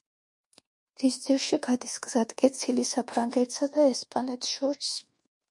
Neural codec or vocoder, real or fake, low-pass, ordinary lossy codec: codec, 24 kHz, 1.2 kbps, DualCodec; fake; 10.8 kHz; MP3, 48 kbps